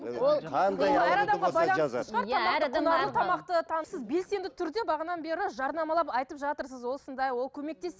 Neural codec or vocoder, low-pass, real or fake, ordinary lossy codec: none; none; real; none